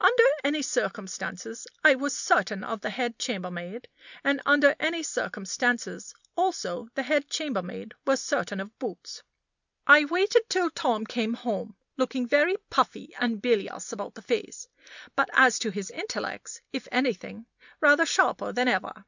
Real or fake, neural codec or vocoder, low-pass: real; none; 7.2 kHz